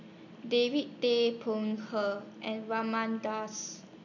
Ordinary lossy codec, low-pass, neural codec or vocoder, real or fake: none; 7.2 kHz; none; real